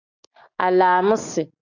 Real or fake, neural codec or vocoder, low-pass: real; none; 7.2 kHz